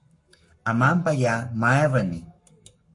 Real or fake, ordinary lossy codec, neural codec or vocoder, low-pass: fake; MP3, 48 kbps; codec, 44.1 kHz, 7.8 kbps, Pupu-Codec; 10.8 kHz